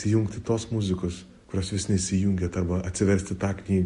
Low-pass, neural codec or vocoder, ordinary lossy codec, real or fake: 14.4 kHz; none; MP3, 48 kbps; real